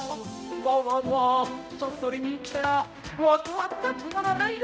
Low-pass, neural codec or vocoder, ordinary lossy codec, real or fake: none; codec, 16 kHz, 0.5 kbps, X-Codec, HuBERT features, trained on balanced general audio; none; fake